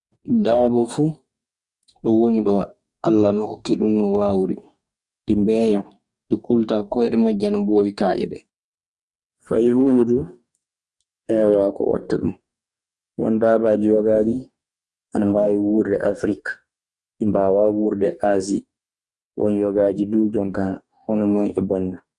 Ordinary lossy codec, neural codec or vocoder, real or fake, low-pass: Opus, 64 kbps; codec, 44.1 kHz, 2.6 kbps, DAC; fake; 10.8 kHz